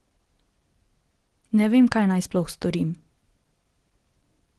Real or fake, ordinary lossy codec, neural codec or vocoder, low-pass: real; Opus, 16 kbps; none; 10.8 kHz